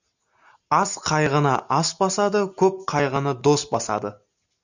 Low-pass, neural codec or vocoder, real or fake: 7.2 kHz; none; real